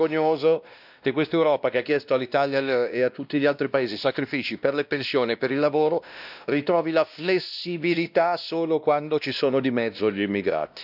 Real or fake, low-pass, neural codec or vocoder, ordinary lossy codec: fake; 5.4 kHz; codec, 16 kHz, 1 kbps, X-Codec, WavLM features, trained on Multilingual LibriSpeech; none